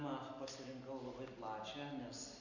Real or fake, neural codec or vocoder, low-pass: real; none; 7.2 kHz